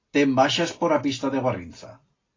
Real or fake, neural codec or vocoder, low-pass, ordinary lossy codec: real; none; 7.2 kHz; AAC, 32 kbps